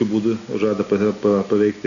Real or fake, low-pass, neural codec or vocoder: real; 7.2 kHz; none